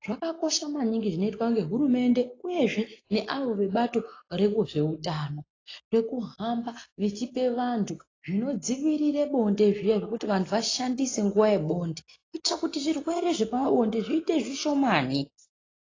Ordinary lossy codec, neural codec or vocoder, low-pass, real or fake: AAC, 32 kbps; none; 7.2 kHz; real